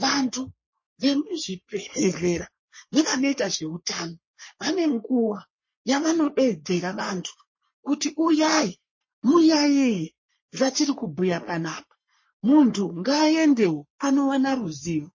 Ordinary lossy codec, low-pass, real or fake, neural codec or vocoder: MP3, 32 kbps; 7.2 kHz; fake; codec, 44.1 kHz, 3.4 kbps, Pupu-Codec